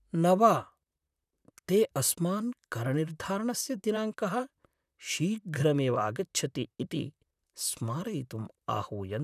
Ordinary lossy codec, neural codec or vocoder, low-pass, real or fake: none; vocoder, 44.1 kHz, 128 mel bands, Pupu-Vocoder; 14.4 kHz; fake